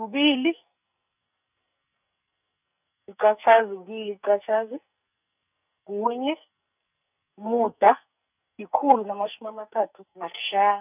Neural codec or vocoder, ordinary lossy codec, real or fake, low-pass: codec, 44.1 kHz, 2.6 kbps, SNAC; none; fake; 3.6 kHz